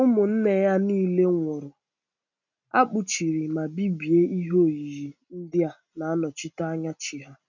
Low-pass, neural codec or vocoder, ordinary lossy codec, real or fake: 7.2 kHz; none; none; real